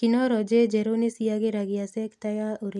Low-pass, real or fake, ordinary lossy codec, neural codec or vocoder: none; real; none; none